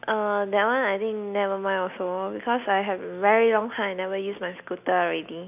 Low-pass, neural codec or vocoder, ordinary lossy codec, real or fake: 3.6 kHz; none; none; real